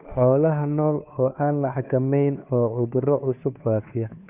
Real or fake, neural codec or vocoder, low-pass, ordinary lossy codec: fake; codec, 16 kHz, 2 kbps, FunCodec, trained on Chinese and English, 25 frames a second; 3.6 kHz; none